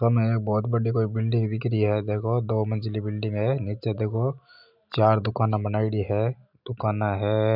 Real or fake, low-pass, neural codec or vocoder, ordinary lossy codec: real; 5.4 kHz; none; none